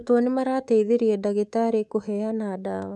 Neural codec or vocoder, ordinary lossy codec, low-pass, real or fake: codec, 24 kHz, 3.1 kbps, DualCodec; none; none; fake